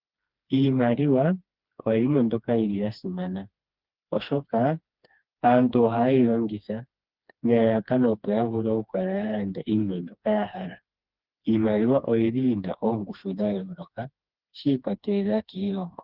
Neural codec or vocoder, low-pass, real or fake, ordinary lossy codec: codec, 16 kHz, 2 kbps, FreqCodec, smaller model; 5.4 kHz; fake; Opus, 32 kbps